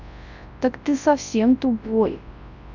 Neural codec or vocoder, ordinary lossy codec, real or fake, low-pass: codec, 24 kHz, 0.9 kbps, WavTokenizer, large speech release; none; fake; 7.2 kHz